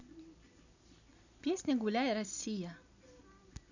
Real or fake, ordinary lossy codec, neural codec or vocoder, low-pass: real; none; none; 7.2 kHz